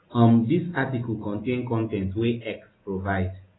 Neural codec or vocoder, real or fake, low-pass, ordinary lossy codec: none; real; 7.2 kHz; AAC, 16 kbps